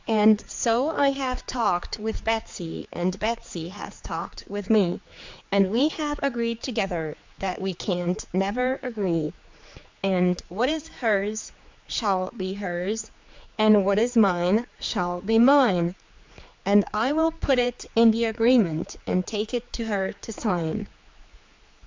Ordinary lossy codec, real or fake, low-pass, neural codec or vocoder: MP3, 64 kbps; fake; 7.2 kHz; codec, 16 kHz, 4 kbps, X-Codec, HuBERT features, trained on general audio